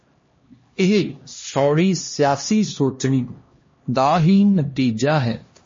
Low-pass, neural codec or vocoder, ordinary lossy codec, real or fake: 7.2 kHz; codec, 16 kHz, 1 kbps, X-Codec, HuBERT features, trained on LibriSpeech; MP3, 32 kbps; fake